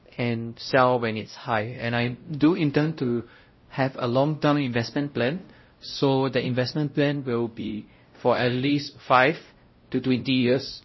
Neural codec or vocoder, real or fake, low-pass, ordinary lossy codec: codec, 16 kHz, 0.5 kbps, X-Codec, WavLM features, trained on Multilingual LibriSpeech; fake; 7.2 kHz; MP3, 24 kbps